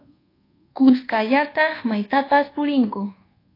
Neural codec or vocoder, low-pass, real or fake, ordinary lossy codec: codec, 24 kHz, 1.2 kbps, DualCodec; 5.4 kHz; fake; AAC, 24 kbps